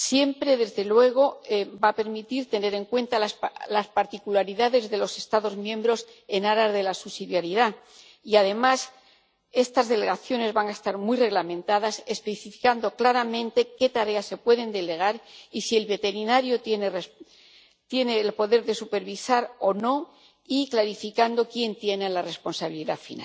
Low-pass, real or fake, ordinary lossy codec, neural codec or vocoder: none; real; none; none